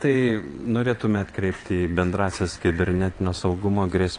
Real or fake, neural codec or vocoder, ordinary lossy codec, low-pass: fake; vocoder, 22.05 kHz, 80 mel bands, WaveNeXt; AAC, 48 kbps; 9.9 kHz